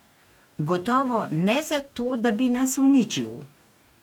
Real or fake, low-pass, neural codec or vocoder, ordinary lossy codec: fake; 19.8 kHz; codec, 44.1 kHz, 2.6 kbps, DAC; none